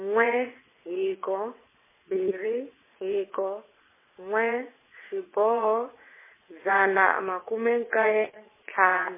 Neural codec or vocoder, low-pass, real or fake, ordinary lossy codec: vocoder, 22.05 kHz, 80 mel bands, WaveNeXt; 3.6 kHz; fake; MP3, 16 kbps